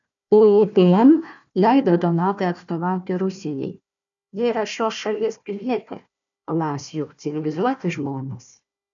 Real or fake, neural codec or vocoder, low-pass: fake; codec, 16 kHz, 1 kbps, FunCodec, trained on Chinese and English, 50 frames a second; 7.2 kHz